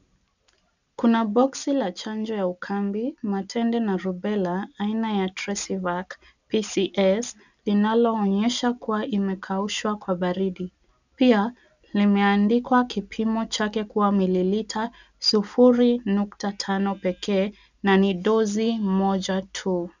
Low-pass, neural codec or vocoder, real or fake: 7.2 kHz; none; real